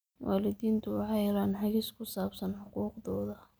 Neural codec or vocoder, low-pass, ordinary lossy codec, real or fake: none; none; none; real